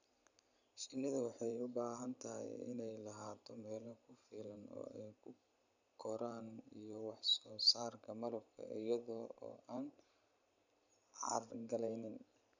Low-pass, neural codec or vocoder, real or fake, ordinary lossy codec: 7.2 kHz; vocoder, 44.1 kHz, 128 mel bands every 512 samples, BigVGAN v2; fake; none